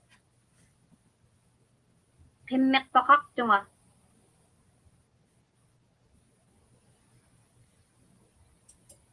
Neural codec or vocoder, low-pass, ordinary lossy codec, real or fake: none; 10.8 kHz; Opus, 24 kbps; real